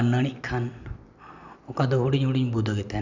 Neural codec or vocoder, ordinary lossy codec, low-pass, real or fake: none; none; 7.2 kHz; real